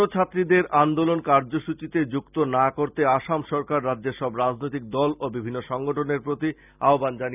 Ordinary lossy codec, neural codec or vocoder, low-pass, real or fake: none; none; 3.6 kHz; real